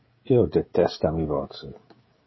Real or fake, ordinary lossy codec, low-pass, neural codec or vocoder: fake; MP3, 24 kbps; 7.2 kHz; codec, 16 kHz, 16 kbps, FreqCodec, smaller model